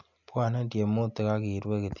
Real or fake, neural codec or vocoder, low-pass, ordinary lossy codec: real; none; 7.2 kHz; none